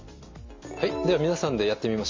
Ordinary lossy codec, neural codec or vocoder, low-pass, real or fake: MP3, 64 kbps; none; 7.2 kHz; real